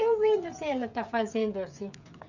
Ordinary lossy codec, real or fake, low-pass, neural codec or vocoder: none; fake; 7.2 kHz; codec, 16 kHz, 16 kbps, FreqCodec, smaller model